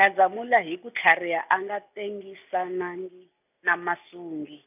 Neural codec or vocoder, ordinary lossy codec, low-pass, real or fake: none; none; 3.6 kHz; real